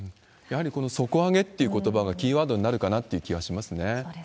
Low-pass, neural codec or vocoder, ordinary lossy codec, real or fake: none; none; none; real